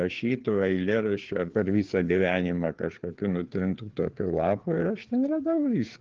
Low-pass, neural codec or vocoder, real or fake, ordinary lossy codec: 7.2 kHz; codec, 16 kHz, 4 kbps, FreqCodec, larger model; fake; Opus, 16 kbps